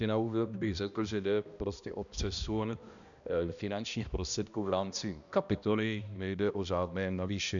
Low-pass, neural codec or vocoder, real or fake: 7.2 kHz; codec, 16 kHz, 1 kbps, X-Codec, HuBERT features, trained on balanced general audio; fake